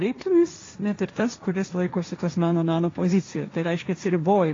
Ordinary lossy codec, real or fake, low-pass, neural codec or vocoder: AAC, 32 kbps; fake; 7.2 kHz; codec, 16 kHz, 1.1 kbps, Voila-Tokenizer